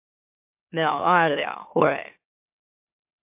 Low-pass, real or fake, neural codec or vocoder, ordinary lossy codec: 3.6 kHz; fake; autoencoder, 44.1 kHz, a latent of 192 numbers a frame, MeloTTS; MP3, 32 kbps